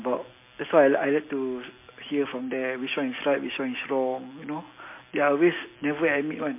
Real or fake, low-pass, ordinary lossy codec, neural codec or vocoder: real; 3.6 kHz; MP3, 24 kbps; none